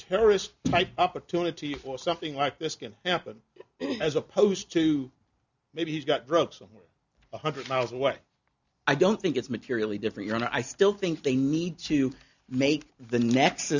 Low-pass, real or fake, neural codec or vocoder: 7.2 kHz; real; none